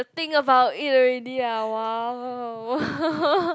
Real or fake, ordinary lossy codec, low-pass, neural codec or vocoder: real; none; none; none